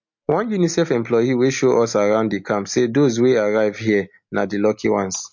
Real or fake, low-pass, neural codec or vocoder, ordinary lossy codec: real; 7.2 kHz; none; MP3, 48 kbps